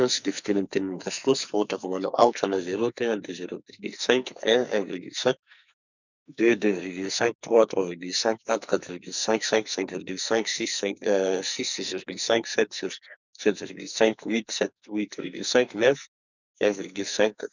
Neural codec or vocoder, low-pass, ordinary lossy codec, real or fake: codec, 44.1 kHz, 2.6 kbps, SNAC; 7.2 kHz; none; fake